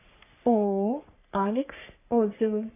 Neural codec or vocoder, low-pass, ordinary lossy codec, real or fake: codec, 44.1 kHz, 3.4 kbps, Pupu-Codec; 3.6 kHz; AAC, 24 kbps; fake